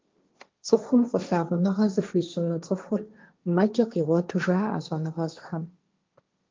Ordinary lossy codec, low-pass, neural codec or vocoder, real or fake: Opus, 24 kbps; 7.2 kHz; codec, 16 kHz, 1.1 kbps, Voila-Tokenizer; fake